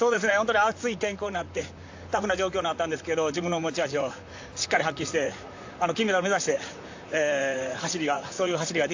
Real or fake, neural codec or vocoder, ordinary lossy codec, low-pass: fake; vocoder, 44.1 kHz, 128 mel bands, Pupu-Vocoder; AAC, 48 kbps; 7.2 kHz